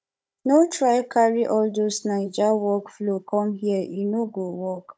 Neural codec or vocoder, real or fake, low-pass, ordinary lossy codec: codec, 16 kHz, 16 kbps, FunCodec, trained on Chinese and English, 50 frames a second; fake; none; none